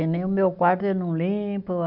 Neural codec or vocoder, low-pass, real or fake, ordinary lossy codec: none; 5.4 kHz; real; none